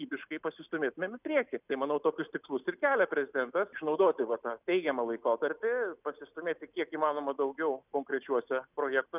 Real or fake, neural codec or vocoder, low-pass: real; none; 3.6 kHz